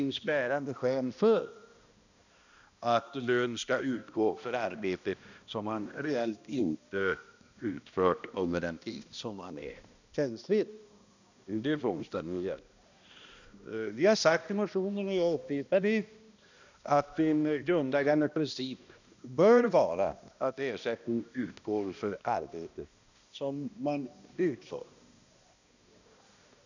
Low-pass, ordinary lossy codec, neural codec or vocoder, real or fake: 7.2 kHz; none; codec, 16 kHz, 1 kbps, X-Codec, HuBERT features, trained on balanced general audio; fake